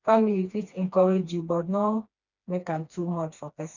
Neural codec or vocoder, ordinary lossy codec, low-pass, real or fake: codec, 16 kHz, 2 kbps, FreqCodec, smaller model; none; 7.2 kHz; fake